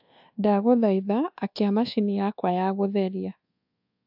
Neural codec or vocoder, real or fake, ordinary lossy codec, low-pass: codec, 24 kHz, 1.2 kbps, DualCodec; fake; AAC, 48 kbps; 5.4 kHz